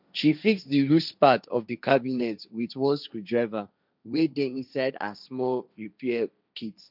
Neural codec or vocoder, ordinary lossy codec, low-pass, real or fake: codec, 16 kHz, 1.1 kbps, Voila-Tokenizer; none; 5.4 kHz; fake